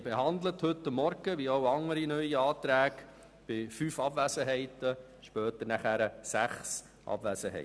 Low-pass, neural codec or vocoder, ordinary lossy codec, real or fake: none; none; none; real